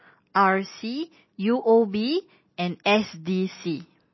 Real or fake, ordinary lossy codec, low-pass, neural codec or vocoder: real; MP3, 24 kbps; 7.2 kHz; none